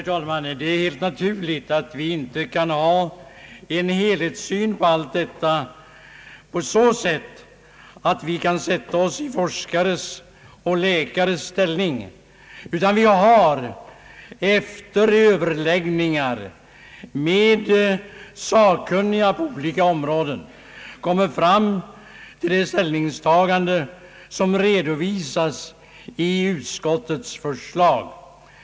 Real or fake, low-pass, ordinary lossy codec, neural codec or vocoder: real; none; none; none